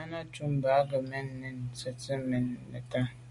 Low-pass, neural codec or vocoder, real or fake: 10.8 kHz; none; real